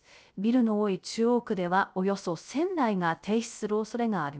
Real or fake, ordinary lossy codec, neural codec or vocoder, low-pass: fake; none; codec, 16 kHz, 0.3 kbps, FocalCodec; none